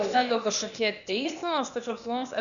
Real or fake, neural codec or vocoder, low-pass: fake; codec, 16 kHz, 0.8 kbps, ZipCodec; 7.2 kHz